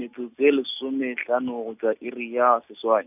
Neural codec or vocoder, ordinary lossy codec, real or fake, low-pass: none; none; real; 3.6 kHz